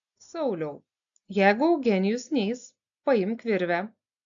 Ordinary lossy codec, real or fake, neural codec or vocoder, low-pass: AAC, 64 kbps; real; none; 7.2 kHz